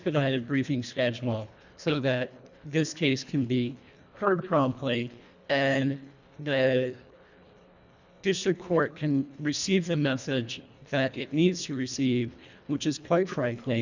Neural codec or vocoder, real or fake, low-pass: codec, 24 kHz, 1.5 kbps, HILCodec; fake; 7.2 kHz